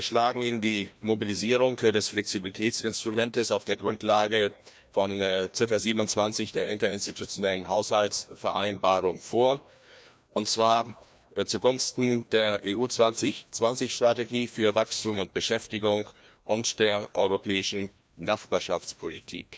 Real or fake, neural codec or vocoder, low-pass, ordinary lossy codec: fake; codec, 16 kHz, 1 kbps, FreqCodec, larger model; none; none